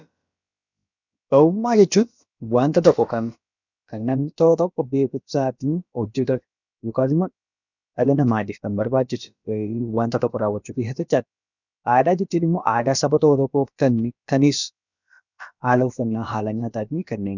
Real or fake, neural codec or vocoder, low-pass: fake; codec, 16 kHz, about 1 kbps, DyCAST, with the encoder's durations; 7.2 kHz